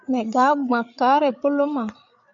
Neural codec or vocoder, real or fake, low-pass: codec, 16 kHz, 8 kbps, FreqCodec, larger model; fake; 7.2 kHz